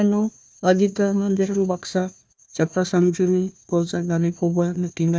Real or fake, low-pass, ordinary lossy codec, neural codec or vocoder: fake; none; none; codec, 16 kHz, 1 kbps, FunCodec, trained on Chinese and English, 50 frames a second